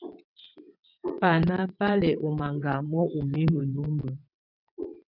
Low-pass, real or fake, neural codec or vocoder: 5.4 kHz; fake; vocoder, 22.05 kHz, 80 mel bands, WaveNeXt